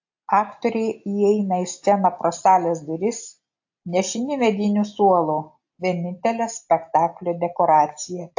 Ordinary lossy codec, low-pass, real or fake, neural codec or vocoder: AAC, 48 kbps; 7.2 kHz; real; none